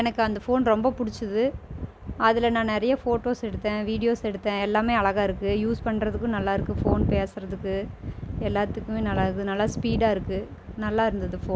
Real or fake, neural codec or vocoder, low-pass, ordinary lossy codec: real; none; none; none